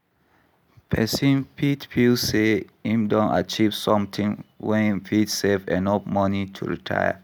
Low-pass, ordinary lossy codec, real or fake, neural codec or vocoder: none; none; real; none